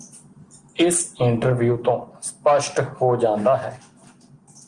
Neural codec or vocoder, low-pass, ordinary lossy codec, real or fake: none; 9.9 kHz; Opus, 24 kbps; real